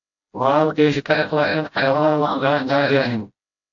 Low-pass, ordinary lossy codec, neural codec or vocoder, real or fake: 7.2 kHz; AAC, 64 kbps; codec, 16 kHz, 0.5 kbps, FreqCodec, smaller model; fake